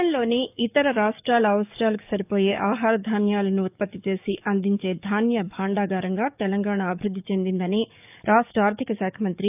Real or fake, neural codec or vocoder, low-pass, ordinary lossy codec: fake; codec, 44.1 kHz, 7.8 kbps, DAC; 3.6 kHz; none